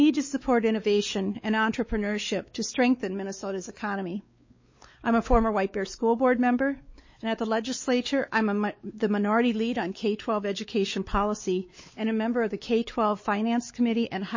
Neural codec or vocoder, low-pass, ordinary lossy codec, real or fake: codec, 16 kHz, 4 kbps, X-Codec, WavLM features, trained on Multilingual LibriSpeech; 7.2 kHz; MP3, 32 kbps; fake